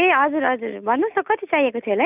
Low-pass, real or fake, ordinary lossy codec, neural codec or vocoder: 3.6 kHz; real; none; none